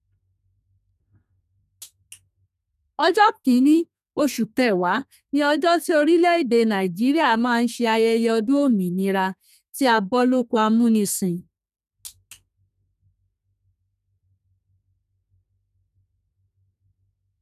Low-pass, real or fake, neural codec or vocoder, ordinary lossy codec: 14.4 kHz; fake; codec, 32 kHz, 1.9 kbps, SNAC; none